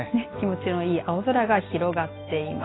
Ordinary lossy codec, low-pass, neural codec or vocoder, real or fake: AAC, 16 kbps; 7.2 kHz; none; real